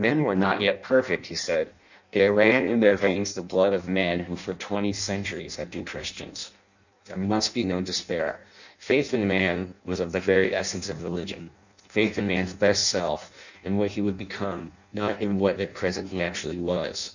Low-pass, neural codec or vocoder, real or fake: 7.2 kHz; codec, 16 kHz in and 24 kHz out, 0.6 kbps, FireRedTTS-2 codec; fake